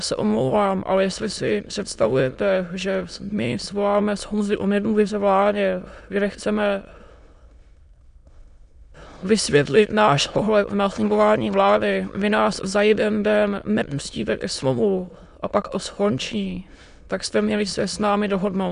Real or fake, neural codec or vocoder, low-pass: fake; autoencoder, 22.05 kHz, a latent of 192 numbers a frame, VITS, trained on many speakers; 9.9 kHz